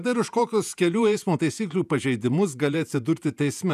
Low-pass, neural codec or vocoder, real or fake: 14.4 kHz; vocoder, 48 kHz, 128 mel bands, Vocos; fake